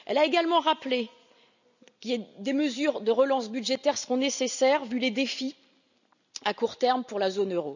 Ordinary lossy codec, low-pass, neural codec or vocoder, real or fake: none; 7.2 kHz; none; real